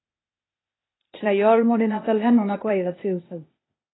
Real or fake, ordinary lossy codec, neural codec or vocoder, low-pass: fake; AAC, 16 kbps; codec, 16 kHz, 0.8 kbps, ZipCodec; 7.2 kHz